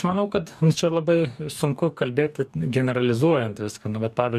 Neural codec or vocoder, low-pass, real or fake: codec, 44.1 kHz, 2.6 kbps, DAC; 14.4 kHz; fake